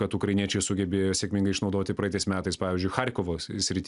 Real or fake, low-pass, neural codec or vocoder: real; 10.8 kHz; none